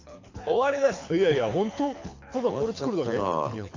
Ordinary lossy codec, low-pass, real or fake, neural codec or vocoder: AAC, 48 kbps; 7.2 kHz; fake; codec, 24 kHz, 6 kbps, HILCodec